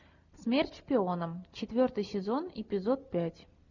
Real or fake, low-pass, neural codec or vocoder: real; 7.2 kHz; none